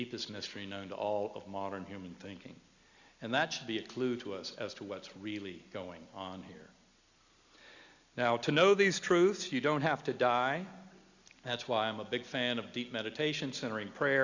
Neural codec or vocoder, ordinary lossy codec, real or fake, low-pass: none; Opus, 64 kbps; real; 7.2 kHz